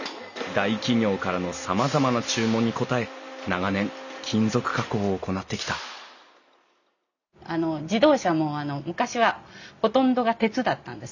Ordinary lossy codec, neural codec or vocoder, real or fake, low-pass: none; none; real; 7.2 kHz